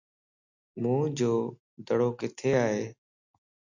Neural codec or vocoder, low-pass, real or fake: none; 7.2 kHz; real